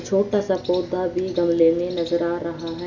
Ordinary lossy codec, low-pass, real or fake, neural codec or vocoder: none; 7.2 kHz; real; none